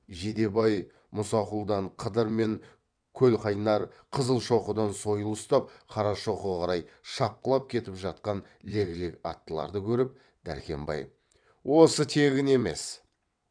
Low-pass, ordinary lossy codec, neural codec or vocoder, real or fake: 9.9 kHz; none; vocoder, 22.05 kHz, 80 mel bands, WaveNeXt; fake